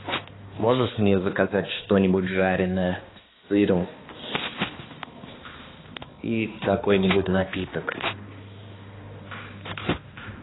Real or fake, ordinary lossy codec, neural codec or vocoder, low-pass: fake; AAC, 16 kbps; codec, 16 kHz, 2 kbps, X-Codec, HuBERT features, trained on balanced general audio; 7.2 kHz